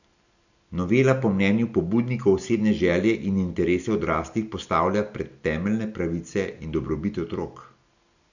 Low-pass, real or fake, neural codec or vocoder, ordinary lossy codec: 7.2 kHz; real; none; none